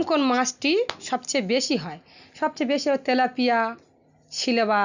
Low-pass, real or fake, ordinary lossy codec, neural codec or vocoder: 7.2 kHz; real; none; none